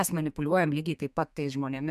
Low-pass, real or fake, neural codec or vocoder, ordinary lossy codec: 14.4 kHz; fake; codec, 32 kHz, 1.9 kbps, SNAC; MP3, 96 kbps